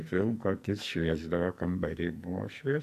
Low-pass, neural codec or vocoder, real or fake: 14.4 kHz; codec, 44.1 kHz, 2.6 kbps, SNAC; fake